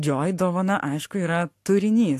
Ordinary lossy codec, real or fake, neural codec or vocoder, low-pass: AAC, 64 kbps; fake; codec, 44.1 kHz, 7.8 kbps, Pupu-Codec; 14.4 kHz